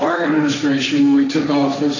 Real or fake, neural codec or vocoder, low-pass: fake; codec, 16 kHz, 1.1 kbps, Voila-Tokenizer; 7.2 kHz